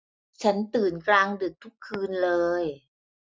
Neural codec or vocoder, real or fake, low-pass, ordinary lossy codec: none; real; none; none